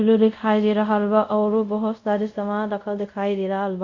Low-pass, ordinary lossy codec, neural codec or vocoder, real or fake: 7.2 kHz; Opus, 64 kbps; codec, 24 kHz, 0.5 kbps, DualCodec; fake